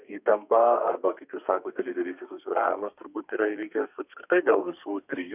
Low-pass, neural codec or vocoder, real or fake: 3.6 kHz; codec, 32 kHz, 1.9 kbps, SNAC; fake